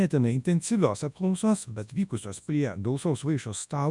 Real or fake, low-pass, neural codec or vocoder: fake; 10.8 kHz; codec, 24 kHz, 0.9 kbps, WavTokenizer, large speech release